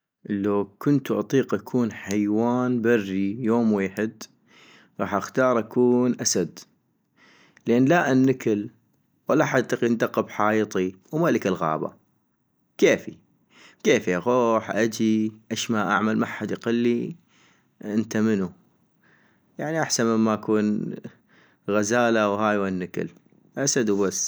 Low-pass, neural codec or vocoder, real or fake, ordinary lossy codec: none; none; real; none